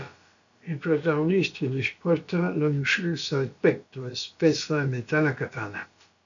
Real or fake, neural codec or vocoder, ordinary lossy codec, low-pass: fake; codec, 16 kHz, about 1 kbps, DyCAST, with the encoder's durations; MP3, 96 kbps; 7.2 kHz